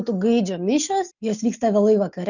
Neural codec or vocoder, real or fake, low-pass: none; real; 7.2 kHz